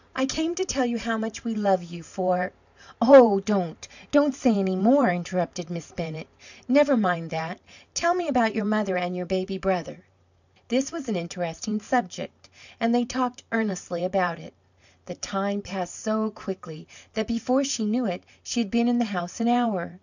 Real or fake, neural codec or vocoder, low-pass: fake; vocoder, 44.1 kHz, 128 mel bands every 512 samples, BigVGAN v2; 7.2 kHz